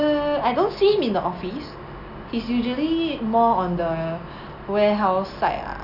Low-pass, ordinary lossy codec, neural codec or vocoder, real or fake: 5.4 kHz; none; none; real